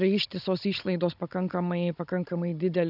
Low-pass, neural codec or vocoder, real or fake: 5.4 kHz; codec, 16 kHz, 16 kbps, FunCodec, trained on Chinese and English, 50 frames a second; fake